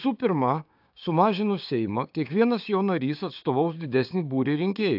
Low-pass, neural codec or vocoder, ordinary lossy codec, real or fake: 5.4 kHz; codec, 16 kHz, 6 kbps, DAC; AAC, 48 kbps; fake